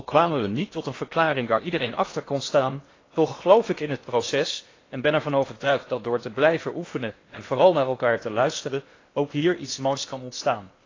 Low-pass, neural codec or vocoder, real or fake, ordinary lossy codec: 7.2 kHz; codec, 16 kHz in and 24 kHz out, 0.8 kbps, FocalCodec, streaming, 65536 codes; fake; AAC, 32 kbps